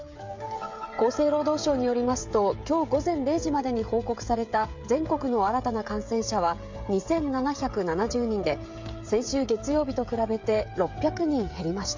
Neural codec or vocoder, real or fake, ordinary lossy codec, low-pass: codec, 16 kHz, 16 kbps, FreqCodec, smaller model; fake; MP3, 64 kbps; 7.2 kHz